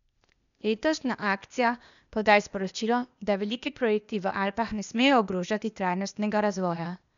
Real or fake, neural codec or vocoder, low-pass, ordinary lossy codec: fake; codec, 16 kHz, 0.8 kbps, ZipCodec; 7.2 kHz; none